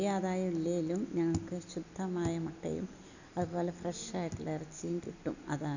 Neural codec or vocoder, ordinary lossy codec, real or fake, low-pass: none; none; real; 7.2 kHz